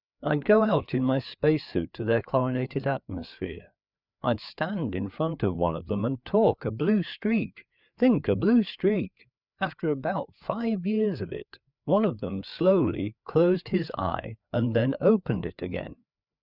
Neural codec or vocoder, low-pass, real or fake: codec, 16 kHz, 4 kbps, FreqCodec, larger model; 5.4 kHz; fake